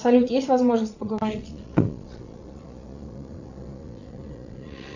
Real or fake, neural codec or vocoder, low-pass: fake; vocoder, 22.05 kHz, 80 mel bands, Vocos; 7.2 kHz